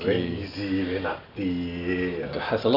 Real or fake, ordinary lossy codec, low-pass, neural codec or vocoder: real; none; 5.4 kHz; none